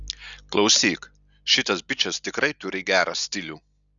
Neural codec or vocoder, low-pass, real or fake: none; 7.2 kHz; real